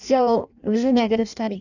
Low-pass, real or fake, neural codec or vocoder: 7.2 kHz; fake; codec, 16 kHz in and 24 kHz out, 0.6 kbps, FireRedTTS-2 codec